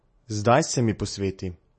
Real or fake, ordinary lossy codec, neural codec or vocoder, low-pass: real; MP3, 32 kbps; none; 10.8 kHz